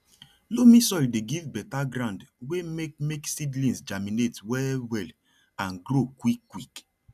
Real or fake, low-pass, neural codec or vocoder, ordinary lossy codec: real; 14.4 kHz; none; none